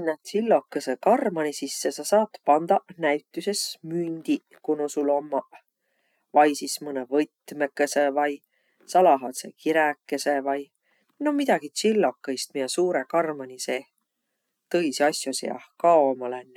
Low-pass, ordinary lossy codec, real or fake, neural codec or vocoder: 19.8 kHz; none; real; none